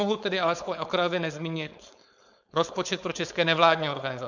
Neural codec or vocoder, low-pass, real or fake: codec, 16 kHz, 4.8 kbps, FACodec; 7.2 kHz; fake